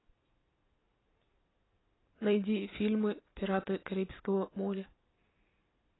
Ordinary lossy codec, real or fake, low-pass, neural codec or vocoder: AAC, 16 kbps; real; 7.2 kHz; none